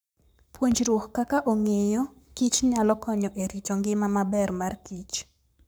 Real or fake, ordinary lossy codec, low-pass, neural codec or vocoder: fake; none; none; codec, 44.1 kHz, 7.8 kbps, Pupu-Codec